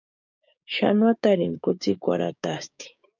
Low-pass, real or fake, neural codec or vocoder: 7.2 kHz; fake; codec, 16 kHz, 6 kbps, DAC